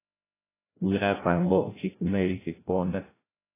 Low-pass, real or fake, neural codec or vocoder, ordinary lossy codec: 3.6 kHz; fake; codec, 16 kHz, 0.5 kbps, FreqCodec, larger model; AAC, 16 kbps